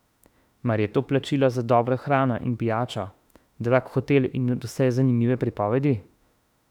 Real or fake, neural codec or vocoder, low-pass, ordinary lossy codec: fake; autoencoder, 48 kHz, 32 numbers a frame, DAC-VAE, trained on Japanese speech; 19.8 kHz; none